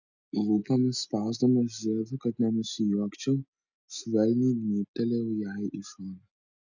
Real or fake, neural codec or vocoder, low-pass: real; none; 7.2 kHz